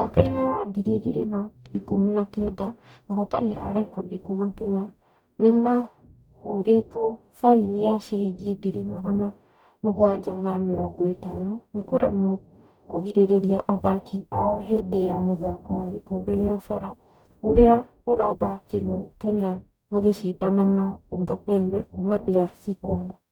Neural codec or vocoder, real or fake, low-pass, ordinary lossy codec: codec, 44.1 kHz, 0.9 kbps, DAC; fake; 19.8 kHz; none